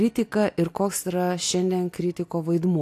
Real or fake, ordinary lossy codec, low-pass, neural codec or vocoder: real; AAC, 64 kbps; 14.4 kHz; none